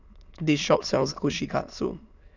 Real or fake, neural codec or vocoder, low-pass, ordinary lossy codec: fake; autoencoder, 22.05 kHz, a latent of 192 numbers a frame, VITS, trained on many speakers; 7.2 kHz; none